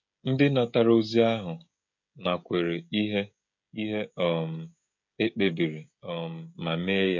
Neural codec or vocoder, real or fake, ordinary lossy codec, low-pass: codec, 16 kHz, 16 kbps, FreqCodec, smaller model; fake; MP3, 48 kbps; 7.2 kHz